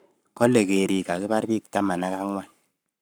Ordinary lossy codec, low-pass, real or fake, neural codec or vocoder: none; none; fake; codec, 44.1 kHz, 7.8 kbps, Pupu-Codec